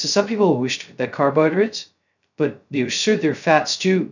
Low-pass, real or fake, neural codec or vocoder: 7.2 kHz; fake; codec, 16 kHz, 0.2 kbps, FocalCodec